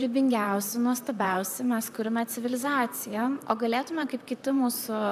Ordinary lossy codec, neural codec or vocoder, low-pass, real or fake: AAC, 96 kbps; vocoder, 44.1 kHz, 128 mel bands, Pupu-Vocoder; 14.4 kHz; fake